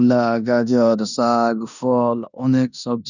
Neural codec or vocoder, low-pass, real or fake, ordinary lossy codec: codec, 16 kHz in and 24 kHz out, 0.9 kbps, LongCat-Audio-Codec, four codebook decoder; 7.2 kHz; fake; none